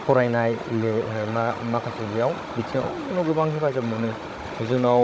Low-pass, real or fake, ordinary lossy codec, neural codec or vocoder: none; fake; none; codec, 16 kHz, 16 kbps, FunCodec, trained on Chinese and English, 50 frames a second